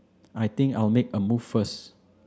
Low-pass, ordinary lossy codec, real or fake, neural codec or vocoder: none; none; real; none